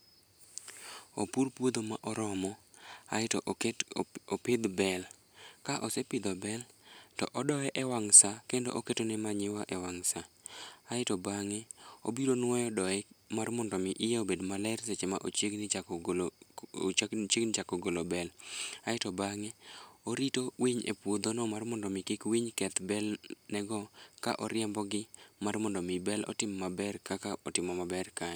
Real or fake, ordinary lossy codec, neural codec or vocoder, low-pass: real; none; none; none